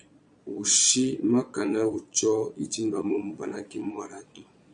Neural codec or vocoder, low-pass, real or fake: vocoder, 22.05 kHz, 80 mel bands, Vocos; 9.9 kHz; fake